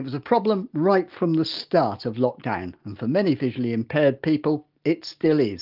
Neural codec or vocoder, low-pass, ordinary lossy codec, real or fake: none; 5.4 kHz; Opus, 24 kbps; real